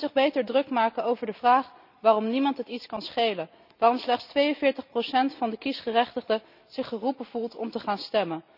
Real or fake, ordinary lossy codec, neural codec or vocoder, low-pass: real; none; none; 5.4 kHz